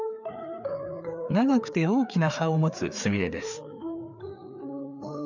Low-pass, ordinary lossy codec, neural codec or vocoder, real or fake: 7.2 kHz; none; codec, 16 kHz, 4 kbps, FreqCodec, larger model; fake